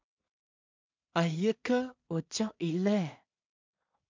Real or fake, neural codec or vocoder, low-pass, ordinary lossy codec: fake; codec, 16 kHz in and 24 kHz out, 0.4 kbps, LongCat-Audio-Codec, two codebook decoder; 7.2 kHz; MP3, 64 kbps